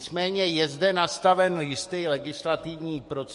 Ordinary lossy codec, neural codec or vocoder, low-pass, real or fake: MP3, 48 kbps; codec, 44.1 kHz, 7.8 kbps, DAC; 14.4 kHz; fake